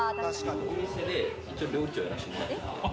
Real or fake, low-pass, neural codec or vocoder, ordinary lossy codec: real; none; none; none